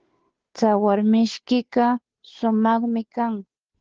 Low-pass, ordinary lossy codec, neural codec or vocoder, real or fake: 7.2 kHz; Opus, 16 kbps; codec, 16 kHz, 2 kbps, FunCodec, trained on Chinese and English, 25 frames a second; fake